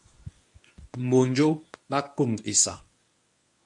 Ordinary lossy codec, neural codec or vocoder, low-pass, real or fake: MP3, 64 kbps; codec, 24 kHz, 0.9 kbps, WavTokenizer, medium speech release version 1; 10.8 kHz; fake